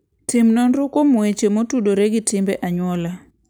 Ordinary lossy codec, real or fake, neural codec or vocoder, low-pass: none; real; none; none